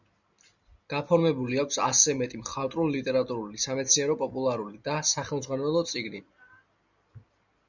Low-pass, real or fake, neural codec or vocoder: 7.2 kHz; real; none